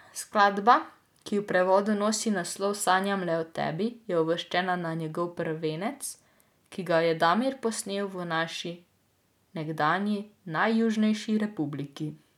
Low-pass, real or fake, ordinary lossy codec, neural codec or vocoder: 19.8 kHz; real; none; none